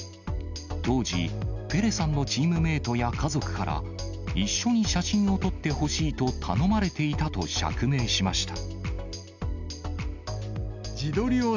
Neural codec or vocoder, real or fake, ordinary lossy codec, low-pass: none; real; none; 7.2 kHz